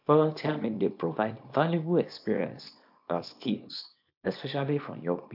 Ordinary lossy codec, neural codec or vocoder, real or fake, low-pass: AAC, 48 kbps; codec, 24 kHz, 0.9 kbps, WavTokenizer, small release; fake; 5.4 kHz